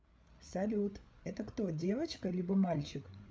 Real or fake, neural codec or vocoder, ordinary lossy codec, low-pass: fake; codec, 16 kHz, 8 kbps, FreqCodec, larger model; none; none